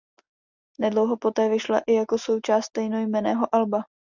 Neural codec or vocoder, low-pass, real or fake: none; 7.2 kHz; real